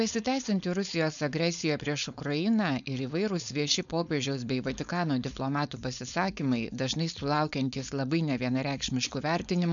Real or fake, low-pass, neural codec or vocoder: fake; 7.2 kHz; codec, 16 kHz, 4.8 kbps, FACodec